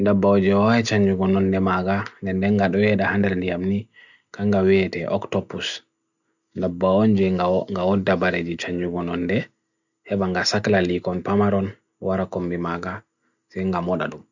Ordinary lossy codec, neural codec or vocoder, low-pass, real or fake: none; none; 7.2 kHz; real